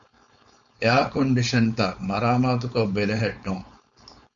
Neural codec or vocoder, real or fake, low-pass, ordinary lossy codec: codec, 16 kHz, 4.8 kbps, FACodec; fake; 7.2 kHz; MP3, 48 kbps